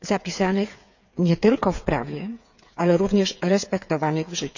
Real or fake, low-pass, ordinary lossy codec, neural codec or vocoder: fake; 7.2 kHz; none; codec, 16 kHz, 8 kbps, FreqCodec, smaller model